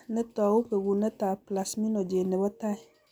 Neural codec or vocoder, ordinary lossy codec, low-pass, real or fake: none; none; none; real